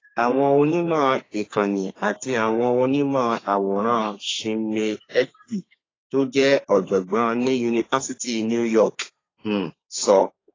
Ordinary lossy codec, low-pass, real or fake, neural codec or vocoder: AAC, 32 kbps; 7.2 kHz; fake; codec, 32 kHz, 1.9 kbps, SNAC